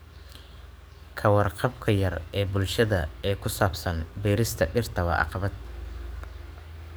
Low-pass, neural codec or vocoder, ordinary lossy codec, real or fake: none; codec, 44.1 kHz, 7.8 kbps, DAC; none; fake